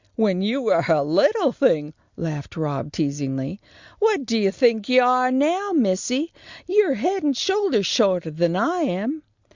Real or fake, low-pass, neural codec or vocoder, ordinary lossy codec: real; 7.2 kHz; none; Opus, 64 kbps